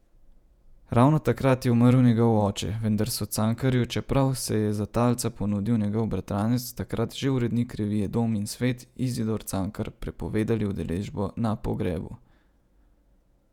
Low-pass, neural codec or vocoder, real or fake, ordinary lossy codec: 19.8 kHz; vocoder, 48 kHz, 128 mel bands, Vocos; fake; none